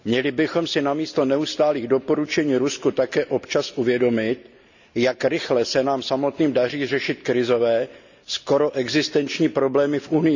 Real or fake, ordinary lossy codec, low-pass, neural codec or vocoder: real; none; 7.2 kHz; none